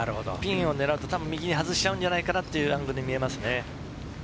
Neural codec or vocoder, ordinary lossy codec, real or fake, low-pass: none; none; real; none